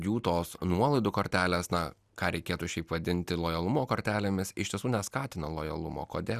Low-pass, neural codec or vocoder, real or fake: 14.4 kHz; none; real